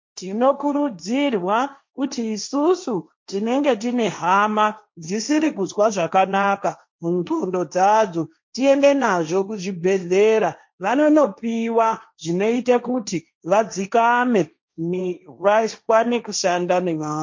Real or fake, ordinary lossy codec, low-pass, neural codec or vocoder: fake; MP3, 48 kbps; 7.2 kHz; codec, 16 kHz, 1.1 kbps, Voila-Tokenizer